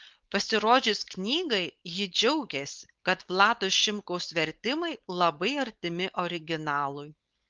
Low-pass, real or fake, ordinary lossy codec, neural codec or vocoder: 7.2 kHz; fake; Opus, 32 kbps; codec, 16 kHz, 4.8 kbps, FACodec